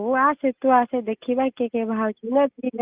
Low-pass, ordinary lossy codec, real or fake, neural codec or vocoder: 3.6 kHz; Opus, 24 kbps; real; none